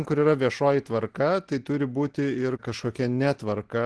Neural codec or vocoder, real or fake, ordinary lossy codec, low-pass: none; real; Opus, 16 kbps; 10.8 kHz